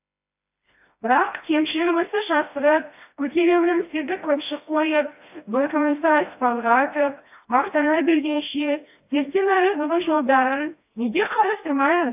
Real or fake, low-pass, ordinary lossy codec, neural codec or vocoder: fake; 3.6 kHz; AAC, 32 kbps; codec, 16 kHz, 1 kbps, FreqCodec, smaller model